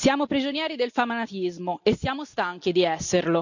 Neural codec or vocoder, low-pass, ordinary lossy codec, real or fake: none; 7.2 kHz; none; real